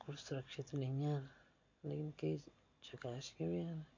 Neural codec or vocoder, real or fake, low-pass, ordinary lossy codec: none; real; 7.2 kHz; MP3, 48 kbps